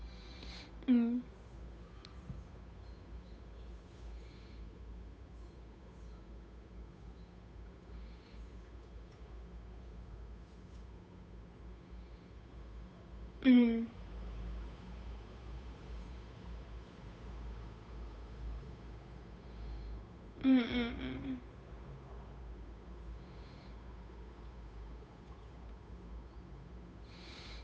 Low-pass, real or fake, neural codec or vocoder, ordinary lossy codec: none; fake; codec, 16 kHz, 8 kbps, FunCodec, trained on Chinese and English, 25 frames a second; none